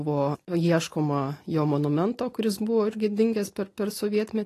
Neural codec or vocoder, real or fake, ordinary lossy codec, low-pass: none; real; AAC, 48 kbps; 14.4 kHz